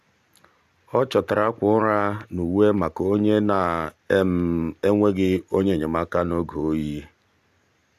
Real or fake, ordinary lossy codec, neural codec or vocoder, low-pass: real; AAC, 96 kbps; none; 14.4 kHz